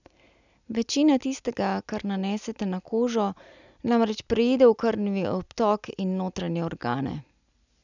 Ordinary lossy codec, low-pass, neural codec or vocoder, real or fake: none; 7.2 kHz; none; real